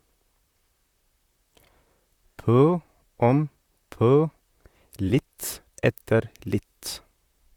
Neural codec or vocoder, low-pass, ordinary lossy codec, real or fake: vocoder, 44.1 kHz, 128 mel bands, Pupu-Vocoder; 19.8 kHz; Opus, 64 kbps; fake